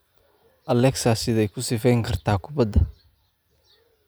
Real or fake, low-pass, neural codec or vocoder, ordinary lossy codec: real; none; none; none